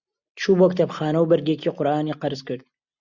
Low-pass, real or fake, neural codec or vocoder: 7.2 kHz; real; none